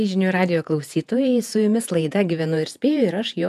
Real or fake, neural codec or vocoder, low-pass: fake; vocoder, 48 kHz, 128 mel bands, Vocos; 14.4 kHz